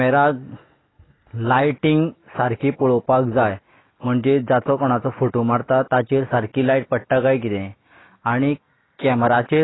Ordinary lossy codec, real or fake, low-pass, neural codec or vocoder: AAC, 16 kbps; real; 7.2 kHz; none